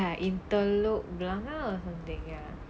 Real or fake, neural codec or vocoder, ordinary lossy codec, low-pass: real; none; none; none